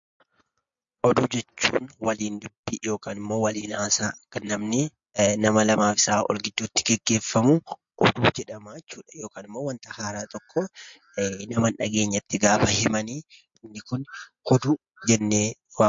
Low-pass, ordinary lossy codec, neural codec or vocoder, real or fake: 7.2 kHz; MP3, 48 kbps; none; real